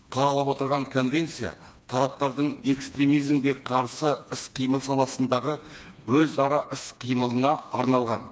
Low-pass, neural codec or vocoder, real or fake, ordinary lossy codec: none; codec, 16 kHz, 2 kbps, FreqCodec, smaller model; fake; none